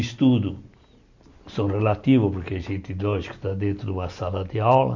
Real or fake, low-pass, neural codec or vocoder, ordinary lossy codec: real; 7.2 kHz; none; none